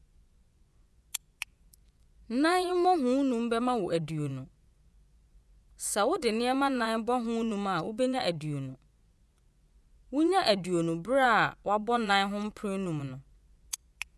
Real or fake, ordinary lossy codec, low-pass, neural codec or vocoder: fake; none; none; vocoder, 24 kHz, 100 mel bands, Vocos